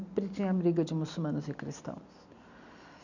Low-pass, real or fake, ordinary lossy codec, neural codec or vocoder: 7.2 kHz; real; none; none